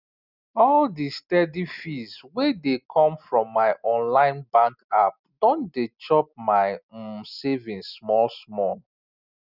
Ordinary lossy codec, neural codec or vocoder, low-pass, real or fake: none; none; 5.4 kHz; real